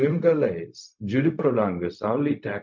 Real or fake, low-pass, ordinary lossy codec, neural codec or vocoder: fake; 7.2 kHz; MP3, 48 kbps; codec, 16 kHz, 0.4 kbps, LongCat-Audio-Codec